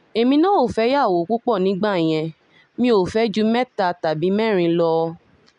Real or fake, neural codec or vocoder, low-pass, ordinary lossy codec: real; none; 10.8 kHz; MP3, 96 kbps